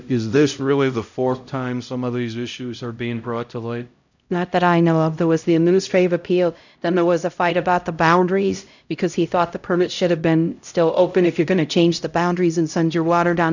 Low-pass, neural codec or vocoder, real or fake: 7.2 kHz; codec, 16 kHz, 0.5 kbps, X-Codec, HuBERT features, trained on LibriSpeech; fake